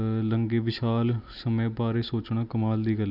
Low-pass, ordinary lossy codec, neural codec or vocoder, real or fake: 5.4 kHz; none; none; real